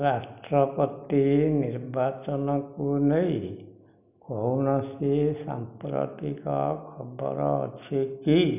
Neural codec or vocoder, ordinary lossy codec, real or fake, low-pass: none; none; real; 3.6 kHz